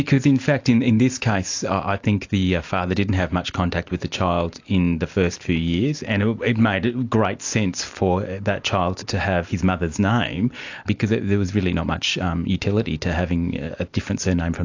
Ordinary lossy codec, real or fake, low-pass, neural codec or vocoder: AAC, 48 kbps; real; 7.2 kHz; none